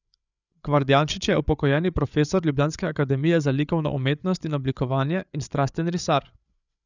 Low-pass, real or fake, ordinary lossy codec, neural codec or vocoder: 7.2 kHz; fake; none; codec, 16 kHz, 8 kbps, FreqCodec, larger model